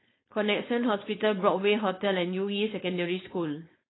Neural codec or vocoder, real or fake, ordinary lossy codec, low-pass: codec, 16 kHz, 4.8 kbps, FACodec; fake; AAC, 16 kbps; 7.2 kHz